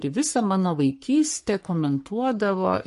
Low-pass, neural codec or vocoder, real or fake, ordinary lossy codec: 14.4 kHz; codec, 44.1 kHz, 3.4 kbps, Pupu-Codec; fake; MP3, 48 kbps